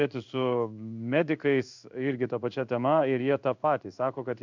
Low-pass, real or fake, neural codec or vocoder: 7.2 kHz; fake; codec, 16 kHz in and 24 kHz out, 1 kbps, XY-Tokenizer